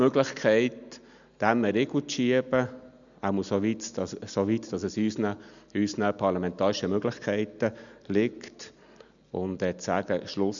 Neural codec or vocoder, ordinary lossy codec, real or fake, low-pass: none; none; real; 7.2 kHz